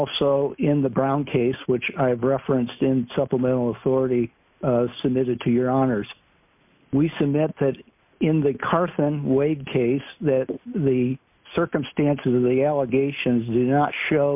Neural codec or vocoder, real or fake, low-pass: none; real; 3.6 kHz